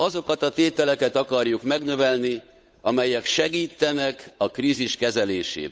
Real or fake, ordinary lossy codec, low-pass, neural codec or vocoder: fake; none; none; codec, 16 kHz, 8 kbps, FunCodec, trained on Chinese and English, 25 frames a second